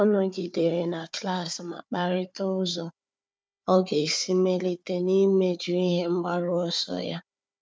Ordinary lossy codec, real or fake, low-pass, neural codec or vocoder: none; fake; none; codec, 16 kHz, 4 kbps, FunCodec, trained on Chinese and English, 50 frames a second